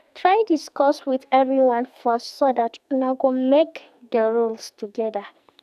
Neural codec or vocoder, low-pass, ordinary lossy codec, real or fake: codec, 44.1 kHz, 2.6 kbps, SNAC; 14.4 kHz; none; fake